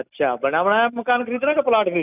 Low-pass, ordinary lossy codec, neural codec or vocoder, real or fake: 3.6 kHz; none; none; real